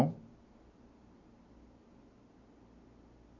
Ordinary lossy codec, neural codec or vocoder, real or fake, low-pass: none; none; real; 7.2 kHz